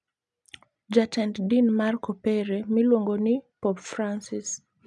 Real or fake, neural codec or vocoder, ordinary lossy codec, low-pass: real; none; none; none